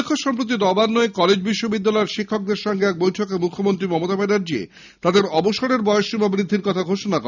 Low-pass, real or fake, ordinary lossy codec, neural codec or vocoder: 7.2 kHz; real; none; none